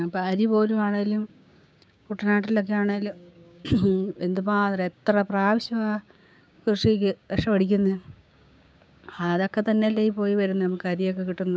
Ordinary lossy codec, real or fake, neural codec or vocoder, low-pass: none; fake; codec, 16 kHz, 6 kbps, DAC; none